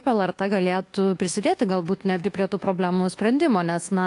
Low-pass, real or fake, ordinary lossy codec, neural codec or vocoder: 10.8 kHz; fake; AAC, 48 kbps; codec, 24 kHz, 1.2 kbps, DualCodec